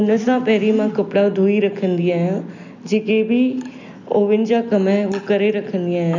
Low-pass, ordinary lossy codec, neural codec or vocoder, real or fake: 7.2 kHz; none; none; real